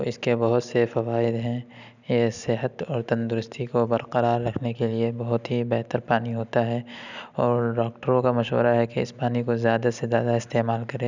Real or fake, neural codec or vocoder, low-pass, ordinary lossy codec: real; none; 7.2 kHz; none